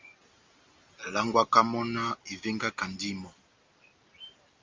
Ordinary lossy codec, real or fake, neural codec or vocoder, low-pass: Opus, 32 kbps; real; none; 7.2 kHz